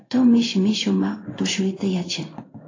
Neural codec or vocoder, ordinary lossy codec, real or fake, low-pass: codec, 16 kHz in and 24 kHz out, 1 kbps, XY-Tokenizer; AAC, 32 kbps; fake; 7.2 kHz